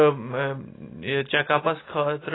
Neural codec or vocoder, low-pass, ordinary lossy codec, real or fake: none; 7.2 kHz; AAC, 16 kbps; real